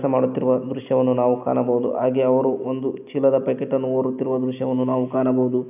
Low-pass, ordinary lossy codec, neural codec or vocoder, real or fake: 3.6 kHz; none; none; real